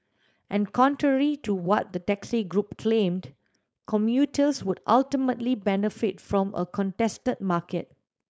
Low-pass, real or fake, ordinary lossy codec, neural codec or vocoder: none; fake; none; codec, 16 kHz, 4.8 kbps, FACodec